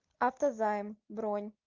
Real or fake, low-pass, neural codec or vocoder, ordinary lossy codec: real; 7.2 kHz; none; Opus, 32 kbps